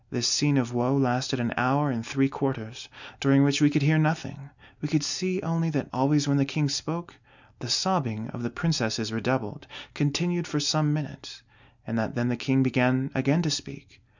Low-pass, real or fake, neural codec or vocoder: 7.2 kHz; real; none